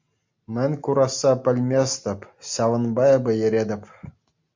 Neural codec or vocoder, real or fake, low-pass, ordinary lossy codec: none; real; 7.2 kHz; MP3, 64 kbps